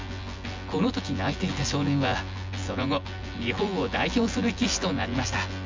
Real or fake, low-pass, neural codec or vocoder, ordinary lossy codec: fake; 7.2 kHz; vocoder, 24 kHz, 100 mel bands, Vocos; MP3, 64 kbps